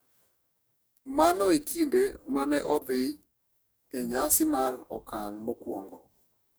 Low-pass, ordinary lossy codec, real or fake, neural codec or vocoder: none; none; fake; codec, 44.1 kHz, 2.6 kbps, DAC